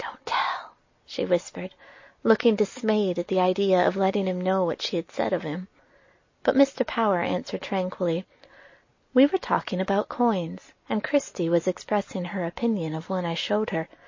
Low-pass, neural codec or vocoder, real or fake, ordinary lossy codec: 7.2 kHz; none; real; MP3, 32 kbps